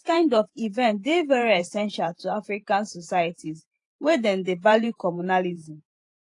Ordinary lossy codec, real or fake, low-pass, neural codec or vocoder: AAC, 48 kbps; fake; 10.8 kHz; vocoder, 48 kHz, 128 mel bands, Vocos